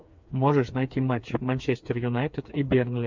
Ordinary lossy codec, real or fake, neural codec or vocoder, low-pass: MP3, 64 kbps; fake; codec, 16 kHz, 4 kbps, FreqCodec, smaller model; 7.2 kHz